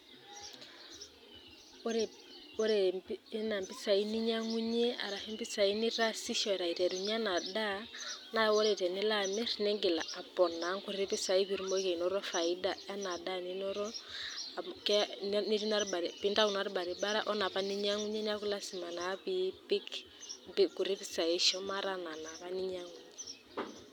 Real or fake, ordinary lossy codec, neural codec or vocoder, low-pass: real; none; none; 19.8 kHz